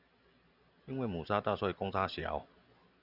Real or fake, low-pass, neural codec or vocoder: real; 5.4 kHz; none